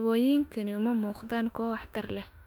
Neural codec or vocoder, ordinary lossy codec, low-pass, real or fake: autoencoder, 48 kHz, 32 numbers a frame, DAC-VAE, trained on Japanese speech; none; 19.8 kHz; fake